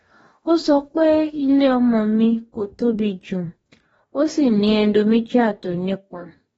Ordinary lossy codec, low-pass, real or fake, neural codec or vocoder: AAC, 24 kbps; 19.8 kHz; fake; codec, 44.1 kHz, 2.6 kbps, DAC